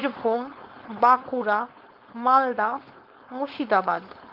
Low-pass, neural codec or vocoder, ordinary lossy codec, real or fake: 5.4 kHz; codec, 16 kHz, 4.8 kbps, FACodec; Opus, 32 kbps; fake